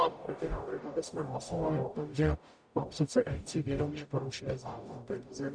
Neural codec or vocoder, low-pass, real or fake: codec, 44.1 kHz, 0.9 kbps, DAC; 9.9 kHz; fake